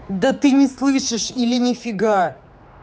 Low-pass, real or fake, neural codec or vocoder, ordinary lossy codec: none; fake; codec, 16 kHz, 4 kbps, X-Codec, HuBERT features, trained on general audio; none